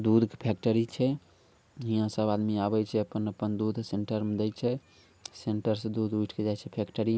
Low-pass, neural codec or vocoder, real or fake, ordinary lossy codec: none; none; real; none